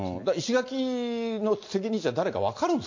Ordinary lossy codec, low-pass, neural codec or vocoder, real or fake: MP3, 48 kbps; 7.2 kHz; none; real